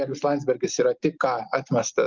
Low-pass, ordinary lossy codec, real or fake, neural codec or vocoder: 7.2 kHz; Opus, 32 kbps; real; none